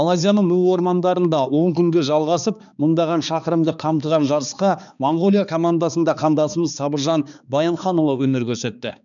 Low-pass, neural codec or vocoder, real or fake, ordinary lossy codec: 7.2 kHz; codec, 16 kHz, 2 kbps, X-Codec, HuBERT features, trained on balanced general audio; fake; none